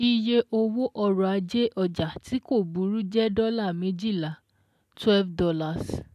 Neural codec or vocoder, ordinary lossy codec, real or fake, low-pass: none; none; real; 14.4 kHz